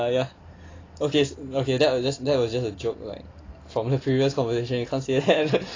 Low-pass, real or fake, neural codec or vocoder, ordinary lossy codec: 7.2 kHz; real; none; AAC, 32 kbps